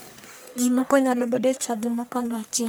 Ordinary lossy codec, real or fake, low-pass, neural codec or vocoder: none; fake; none; codec, 44.1 kHz, 1.7 kbps, Pupu-Codec